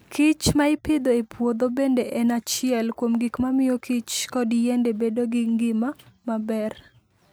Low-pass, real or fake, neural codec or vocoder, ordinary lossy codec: none; real; none; none